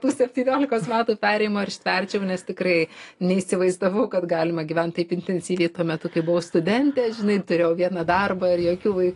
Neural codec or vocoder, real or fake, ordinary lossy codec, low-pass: none; real; AAC, 48 kbps; 10.8 kHz